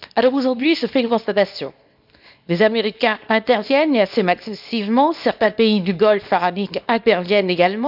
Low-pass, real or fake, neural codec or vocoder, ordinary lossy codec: 5.4 kHz; fake; codec, 24 kHz, 0.9 kbps, WavTokenizer, small release; none